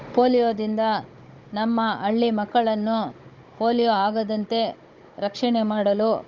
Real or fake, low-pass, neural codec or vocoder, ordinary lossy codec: fake; 7.2 kHz; codec, 16 kHz, 16 kbps, FunCodec, trained on Chinese and English, 50 frames a second; Opus, 24 kbps